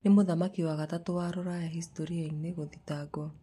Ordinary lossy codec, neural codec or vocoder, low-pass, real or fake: MP3, 64 kbps; none; 14.4 kHz; real